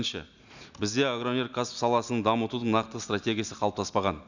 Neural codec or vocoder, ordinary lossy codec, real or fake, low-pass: none; none; real; 7.2 kHz